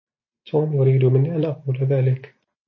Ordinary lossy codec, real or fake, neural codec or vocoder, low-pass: MP3, 32 kbps; real; none; 7.2 kHz